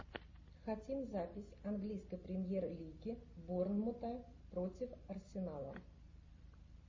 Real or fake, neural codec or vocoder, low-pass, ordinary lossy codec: real; none; 7.2 kHz; MP3, 32 kbps